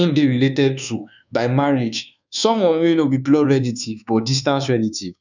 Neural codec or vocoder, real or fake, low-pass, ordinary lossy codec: codec, 24 kHz, 1.2 kbps, DualCodec; fake; 7.2 kHz; none